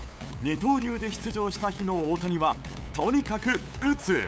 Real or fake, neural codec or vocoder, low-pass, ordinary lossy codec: fake; codec, 16 kHz, 8 kbps, FunCodec, trained on LibriTTS, 25 frames a second; none; none